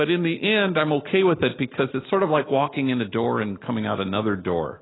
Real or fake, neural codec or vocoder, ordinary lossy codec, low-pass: real; none; AAC, 16 kbps; 7.2 kHz